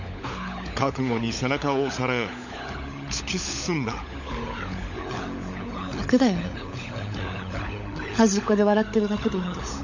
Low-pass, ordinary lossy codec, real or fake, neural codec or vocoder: 7.2 kHz; none; fake; codec, 16 kHz, 16 kbps, FunCodec, trained on LibriTTS, 50 frames a second